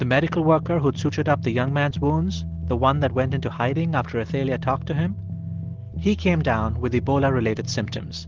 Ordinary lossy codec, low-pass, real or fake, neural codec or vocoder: Opus, 16 kbps; 7.2 kHz; real; none